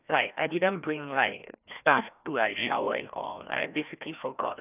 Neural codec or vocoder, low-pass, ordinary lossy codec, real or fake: codec, 16 kHz, 1 kbps, FreqCodec, larger model; 3.6 kHz; none; fake